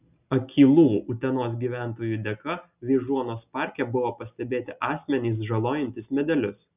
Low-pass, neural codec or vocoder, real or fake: 3.6 kHz; none; real